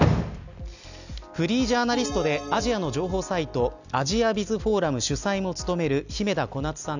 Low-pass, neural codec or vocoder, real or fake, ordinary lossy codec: 7.2 kHz; none; real; none